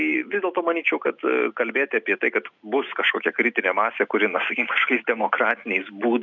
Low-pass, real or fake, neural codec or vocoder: 7.2 kHz; real; none